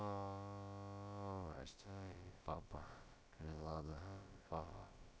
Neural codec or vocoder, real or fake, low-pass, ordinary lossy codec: codec, 16 kHz, about 1 kbps, DyCAST, with the encoder's durations; fake; none; none